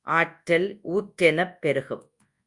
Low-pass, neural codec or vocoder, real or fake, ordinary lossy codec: 10.8 kHz; codec, 24 kHz, 0.9 kbps, WavTokenizer, large speech release; fake; Opus, 64 kbps